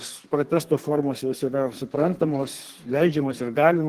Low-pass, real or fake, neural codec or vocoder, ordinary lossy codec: 14.4 kHz; fake; codec, 32 kHz, 1.9 kbps, SNAC; Opus, 16 kbps